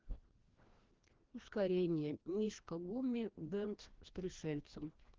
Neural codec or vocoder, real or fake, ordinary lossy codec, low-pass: codec, 16 kHz, 1 kbps, FreqCodec, larger model; fake; Opus, 16 kbps; 7.2 kHz